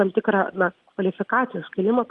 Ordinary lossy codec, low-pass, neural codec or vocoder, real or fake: Opus, 24 kbps; 10.8 kHz; none; real